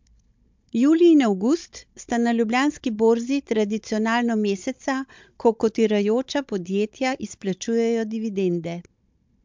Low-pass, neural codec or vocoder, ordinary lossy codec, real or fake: 7.2 kHz; codec, 16 kHz, 16 kbps, FunCodec, trained on Chinese and English, 50 frames a second; MP3, 64 kbps; fake